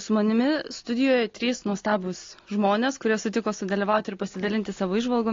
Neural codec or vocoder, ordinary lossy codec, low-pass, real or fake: none; AAC, 32 kbps; 7.2 kHz; real